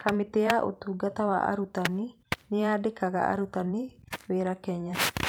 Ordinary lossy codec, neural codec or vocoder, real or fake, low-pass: none; vocoder, 44.1 kHz, 128 mel bands every 512 samples, BigVGAN v2; fake; none